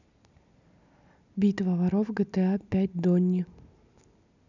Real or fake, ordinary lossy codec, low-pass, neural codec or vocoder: real; none; 7.2 kHz; none